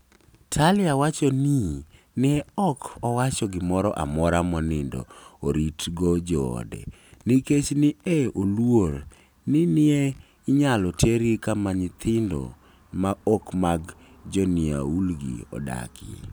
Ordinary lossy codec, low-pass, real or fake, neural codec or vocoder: none; none; real; none